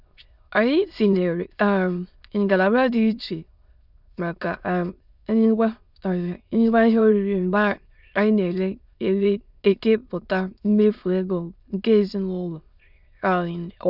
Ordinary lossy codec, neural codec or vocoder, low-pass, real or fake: none; autoencoder, 22.05 kHz, a latent of 192 numbers a frame, VITS, trained on many speakers; 5.4 kHz; fake